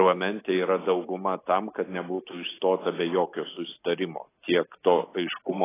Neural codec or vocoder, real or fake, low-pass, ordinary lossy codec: none; real; 3.6 kHz; AAC, 16 kbps